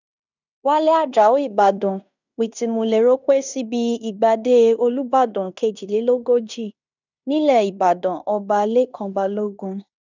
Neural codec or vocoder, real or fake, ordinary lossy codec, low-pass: codec, 16 kHz in and 24 kHz out, 0.9 kbps, LongCat-Audio-Codec, fine tuned four codebook decoder; fake; none; 7.2 kHz